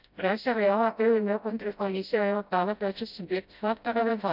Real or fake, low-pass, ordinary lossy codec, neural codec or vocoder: fake; 5.4 kHz; none; codec, 16 kHz, 0.5 kbps, FreqCodec, smaller model